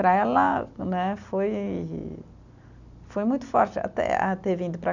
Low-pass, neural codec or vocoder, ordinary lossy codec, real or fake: 7.2 kHz; none; none; real